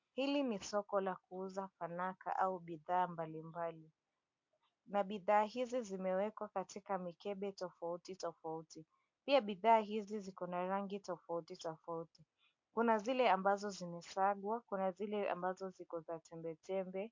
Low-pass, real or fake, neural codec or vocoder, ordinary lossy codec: 7.2 kHz; real; none; MP3, 48 kbps